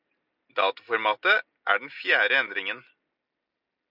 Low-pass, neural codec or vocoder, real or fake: 5.4 kHz; none; real